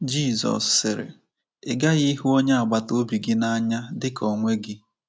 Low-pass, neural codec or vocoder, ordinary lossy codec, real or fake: none; none; none; real